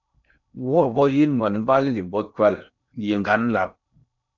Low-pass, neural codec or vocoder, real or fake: 7.2 kHz; codec, 16 kHz in and 24 kHz out, 0.8 kbps, FocalCodec, streaming, 65536 codes; fake